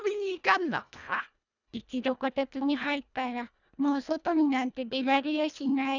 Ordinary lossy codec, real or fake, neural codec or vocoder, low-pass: none; fake; codec, 24 kHz, 1.5 kbps, HILCodec; 7.2 kHz